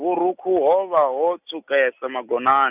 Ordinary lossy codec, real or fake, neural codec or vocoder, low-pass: none; real; none; 3.6 kHz